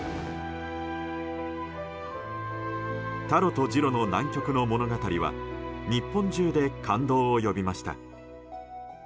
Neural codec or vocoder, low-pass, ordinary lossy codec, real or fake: none; none; none; real